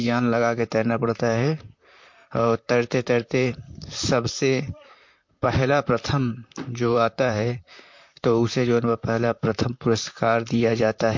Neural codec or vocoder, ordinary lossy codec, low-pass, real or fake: vocoder, 44.1 kHz, 128 mel bands, Pupu-Vocoder; MP3, 48 kbps; 7.2 kHz; fake